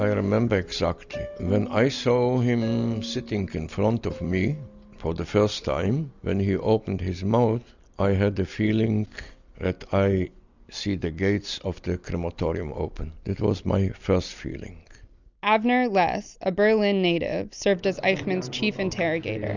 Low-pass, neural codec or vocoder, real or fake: 7.2 kHz; none; real